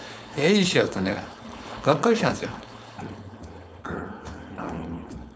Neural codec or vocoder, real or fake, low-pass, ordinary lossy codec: codec, 16 kHz, 4.8 kbps, FACodec; fake; none; none